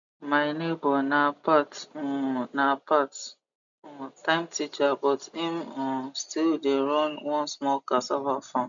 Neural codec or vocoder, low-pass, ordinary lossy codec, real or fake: none; 7.2 kHz; MP3, 96 kbps; real